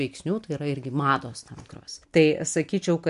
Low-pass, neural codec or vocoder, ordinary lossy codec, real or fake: 10.8 kHz; none; MP3, 64 kbps; real